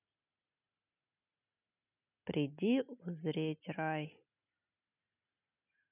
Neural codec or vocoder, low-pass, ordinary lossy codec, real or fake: none; 3.6 kHz; none; real